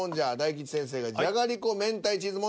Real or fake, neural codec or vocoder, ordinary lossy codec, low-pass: real; none; none; none